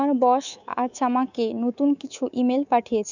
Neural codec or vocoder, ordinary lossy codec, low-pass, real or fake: codec, 24 kHz, 3.1 kbps, DualCodec; none; 7.2 kHz; fake